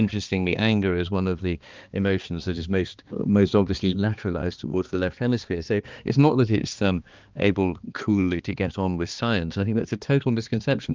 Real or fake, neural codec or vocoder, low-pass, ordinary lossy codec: fake; codec, 16 kHz, 2 kbps, X-Codec, HuBERT features, trained on balanced general audio; 7.2 kHz; Opus, 24 kbps